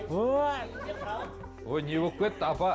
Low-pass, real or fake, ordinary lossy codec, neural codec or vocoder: none; real; none; none